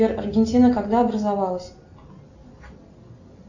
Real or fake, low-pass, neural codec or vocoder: real; 7.2 kHz; none